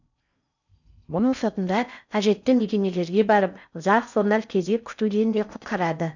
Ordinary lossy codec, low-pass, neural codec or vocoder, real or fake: none; 7.2 kHz; codec, 16 kHz in and 24 kHz out, 0.6 kbps, FocalCodec, streaming, 4096 codes; fake